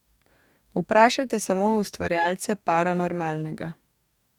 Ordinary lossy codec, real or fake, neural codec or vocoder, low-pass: none; fake; codec, 44.1 kHz, 2.6 kbps, DAC; 19.8 kHz